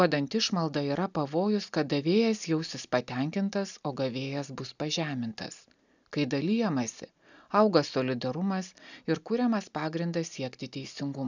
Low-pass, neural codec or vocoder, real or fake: 7.2 kHz; none; real